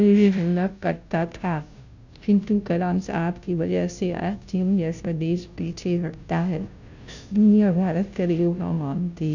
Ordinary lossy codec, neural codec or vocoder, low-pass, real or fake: none; codec, 16 kHz, 0.5 kbps, FunCodec, trained on Chinese and English, 25 frames a second; 7.2 kHz; fake